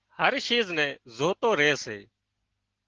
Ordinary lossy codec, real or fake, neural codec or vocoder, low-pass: Opus, 16 kbps; real; none; 7.2 kHz